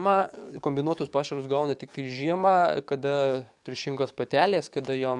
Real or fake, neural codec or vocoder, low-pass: fake; codec, 44.1 kHz, 7.8 kbps, DAC; 10.8 kHz